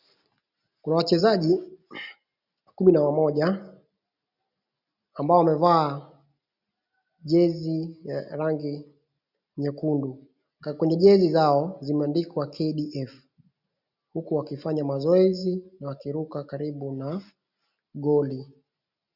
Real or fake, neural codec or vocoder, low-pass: real; none; 5.4 kHz